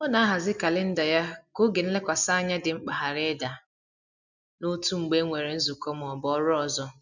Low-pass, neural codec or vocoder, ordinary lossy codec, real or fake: 7.2 kHz; none; none; real